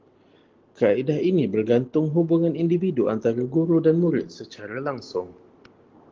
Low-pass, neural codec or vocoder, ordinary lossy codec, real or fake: 7.2 kHz; none; Opus, 16 kbps; real